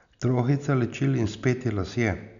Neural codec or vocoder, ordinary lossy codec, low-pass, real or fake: none; MP3, 96 kbps; 7.2 kHz; real